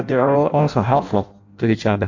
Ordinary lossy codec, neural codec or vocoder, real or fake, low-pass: MP3, 48 kbps; codec, 16 kHz in and 24 kHz out, 0.6 kbps, FireRedTTS-2 codec; fake; 7.2 kHz